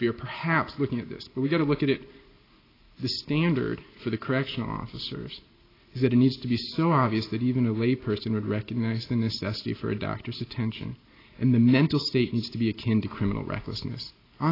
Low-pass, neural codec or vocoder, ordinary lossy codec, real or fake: 5.4 kHz; none; AAC, 24 kbps; real